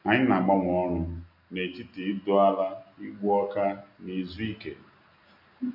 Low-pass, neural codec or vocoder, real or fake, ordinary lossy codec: 5.4 kHz; none; real; none